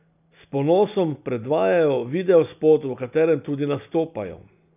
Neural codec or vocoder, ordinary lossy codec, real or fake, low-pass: none; none; real; 3.6 kHz